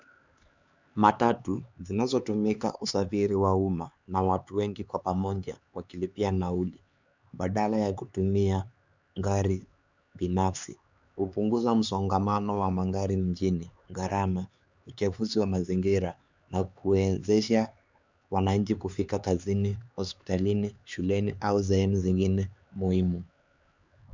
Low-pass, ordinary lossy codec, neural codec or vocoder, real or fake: 7.2 kHz; Opus, 64 kbps; codec, 16 kHz, 4 kbps, X-Codec, HuBERT features, trained on LibriSpeech; fake